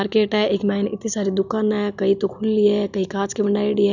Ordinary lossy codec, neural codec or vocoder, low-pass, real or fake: none; none; 7.2 kHz; real